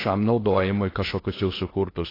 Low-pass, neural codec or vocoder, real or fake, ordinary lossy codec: 5.4 kHz; codec, 16 kHz in and 24 kHz out, 0.6 kbps, FocalCodec, streaming, 2048 codes; fake; AAC, 24 kbps